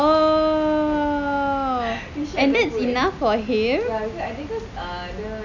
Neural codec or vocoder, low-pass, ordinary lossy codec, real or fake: none; 7.2 kHz; none; real